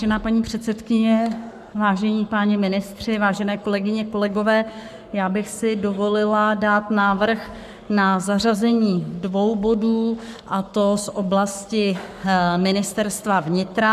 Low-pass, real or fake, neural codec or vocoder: 14.4 kHz; fake; codec, 44.1 kHz, 7.8 kbps, Pupu-Codec